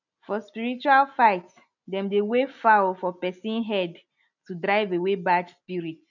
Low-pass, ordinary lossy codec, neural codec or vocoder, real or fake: 7.2 kHz; none; none; real